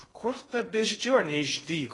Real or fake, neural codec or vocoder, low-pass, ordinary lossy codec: fake; codec, 16 kHz in and 24 kHz out, 0.6 kbps, FocalCodec, streaming, 2048 codes; 10.8 kHz; AAC, 32 kbps